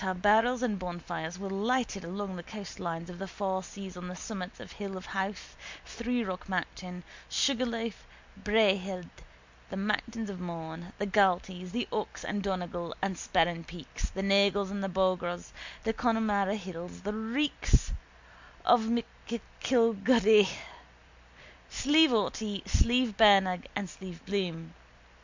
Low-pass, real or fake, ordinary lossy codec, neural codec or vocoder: 7.2 kHz; real; MP3, 64 kbps; none